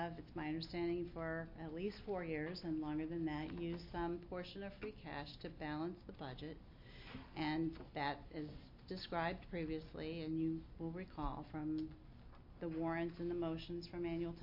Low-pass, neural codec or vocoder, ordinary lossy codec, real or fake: 5.4 kHz; none; MP3, 32 kbps; real